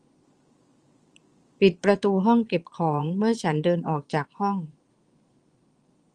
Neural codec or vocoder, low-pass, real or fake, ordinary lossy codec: vocoder, 22.05 kHz, 80 mel bands, Vocos; 9.9 kHz; fake; Opus, 24 kbps